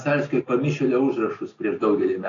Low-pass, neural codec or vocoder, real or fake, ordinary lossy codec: 7.2 kHz; none; real; AAC, 32 kbps